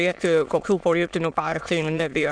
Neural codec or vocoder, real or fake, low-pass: autoencoder, 22.05 kHz, a latent of 192 numbers a frame, VITS, trained on many speakers; fake; 9.9 kHz